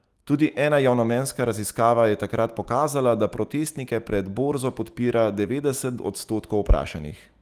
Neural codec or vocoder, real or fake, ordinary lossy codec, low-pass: autoencoder, 48 kHz, 128 numbers a frame, DAC-VAE, trained on Japanese speech; fake; Opus, 24 kbps; 14.4 kHz